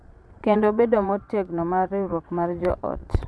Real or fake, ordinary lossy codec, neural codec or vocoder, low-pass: fake; none; vocoder, 22.05 kHz, 80 mel bands, Vocos; none